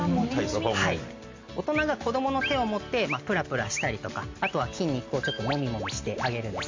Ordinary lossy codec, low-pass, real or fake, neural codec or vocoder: MP3, 48 kbps; 7.2 kHz; real; none